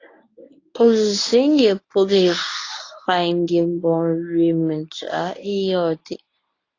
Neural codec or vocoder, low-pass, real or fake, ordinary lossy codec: codec, 24 kHz, 0.9 kbps, WavTokenizer, medium speech release version 1; 7.2 kHz; fake; AAC, 32 kbps